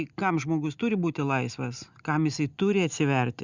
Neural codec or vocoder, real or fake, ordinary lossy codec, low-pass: none; real; Opus, 64 kbps; 7.2 kHz